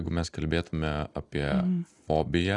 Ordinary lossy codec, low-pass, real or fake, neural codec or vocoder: AAC, 48 kbps; 10.8 kHz; real; none